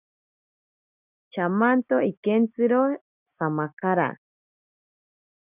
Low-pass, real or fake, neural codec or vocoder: 3.6 kHz; real; none